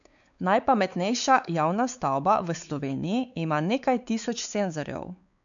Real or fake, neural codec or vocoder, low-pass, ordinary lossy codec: fake; codec, 16 kHz, 4 kbps, X-Codec, WavLM features, trained on Multilingual LibriSpeech; 7.2 kHz; none